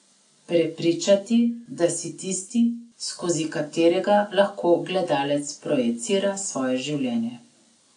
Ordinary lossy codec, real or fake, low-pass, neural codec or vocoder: AAC, 48 kbps; real; 9.9 kHz; none